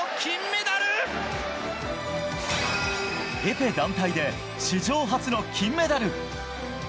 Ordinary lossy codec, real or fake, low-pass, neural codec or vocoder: none; real; none; none